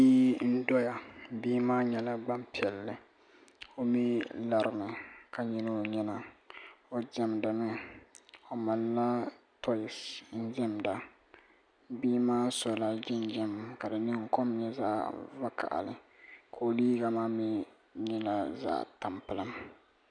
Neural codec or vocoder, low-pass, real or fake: none; 9.9 kHz; real